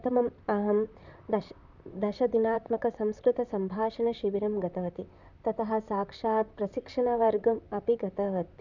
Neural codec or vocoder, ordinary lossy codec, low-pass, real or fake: vocoder, 44.1 kHz, 128 mel bands, Pupu-Vocoder; none; 7.2 kHz; fake